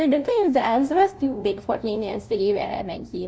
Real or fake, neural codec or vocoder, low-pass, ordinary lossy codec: fake; codec, 16 kHz, 0.5 kbps, FunCodec, trained on LibriTTS, 25 frames a second; none; none